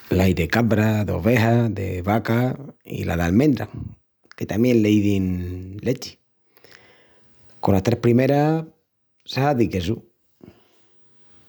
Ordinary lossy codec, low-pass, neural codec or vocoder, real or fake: none; none; none; real